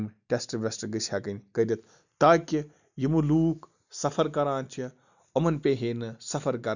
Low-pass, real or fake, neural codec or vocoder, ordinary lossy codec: 7.2 kHz; real; none; none